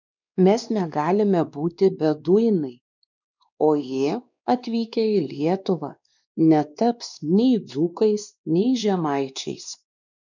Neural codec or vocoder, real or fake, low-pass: codec, 16 kHz, 2 kbps, X-Codec, WavLM features, trained on Multilingual LibriSpeech; fake; 7.2 kHz